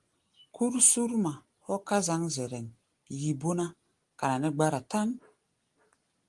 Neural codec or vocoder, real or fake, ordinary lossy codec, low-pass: none; real; Opus, 24 kbps; 10.8 kHz